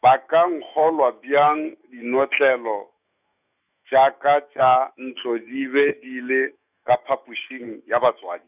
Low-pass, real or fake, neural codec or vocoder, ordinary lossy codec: 3.6 kHz; fake; autoencoder, 48 kHz, 128 numbers a frame, DAC-VAE, trained on Japanese speech; none